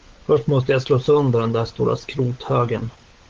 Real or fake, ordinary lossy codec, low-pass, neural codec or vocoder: fake; Opus, 16 kbps; 7.2 kHz; codec, 16 kHz, 16 kbps, FunCodec, trained on LibriTTS, 50 frames a second